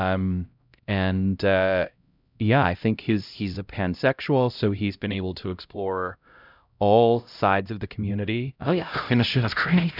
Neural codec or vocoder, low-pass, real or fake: codec, 16 kHz, 0.5 kbps, X-Codec, HuBERT features, trained on LibriSpeech; 5.4 kHz; fake